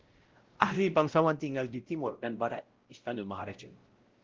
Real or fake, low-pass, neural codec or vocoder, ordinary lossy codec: fake; 7.2 kHz; codec, 16 kHz, 0.5 kbps, X-Codec, WavLM features, trained on Multilingual LibriSpeech; Opus, 16 kbps